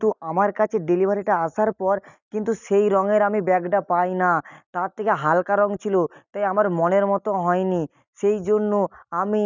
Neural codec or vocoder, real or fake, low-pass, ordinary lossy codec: none; real; 7.2 kHz; none